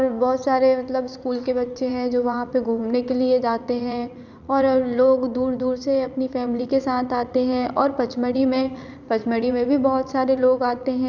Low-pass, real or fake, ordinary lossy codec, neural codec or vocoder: 7.2 kHz; fake; none; vocoder, 44.1 kHz, 80 mel bands, Vocos